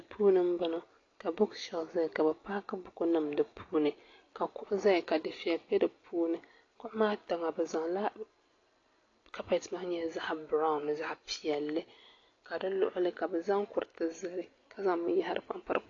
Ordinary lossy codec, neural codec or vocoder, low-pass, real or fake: AAC, 32 kbps; none; 7.2 kHz; real